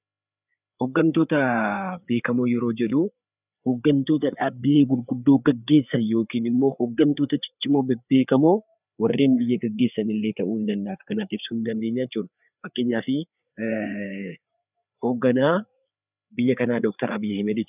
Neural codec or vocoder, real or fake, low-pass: codec, 16 kHz, 4 kbps, FreqCodec, larger model; fake; 3.6 kHz